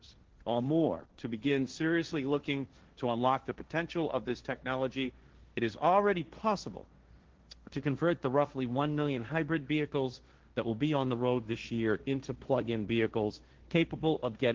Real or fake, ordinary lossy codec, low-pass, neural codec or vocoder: fake; Opus, 16 kbps; 7.2 kHz; codec, 16 kHz, 1.1 kbps, Voila-Tokenizer